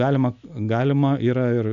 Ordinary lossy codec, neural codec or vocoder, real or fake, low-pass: AAC, 96 kbps; none; real; 7.2 kHz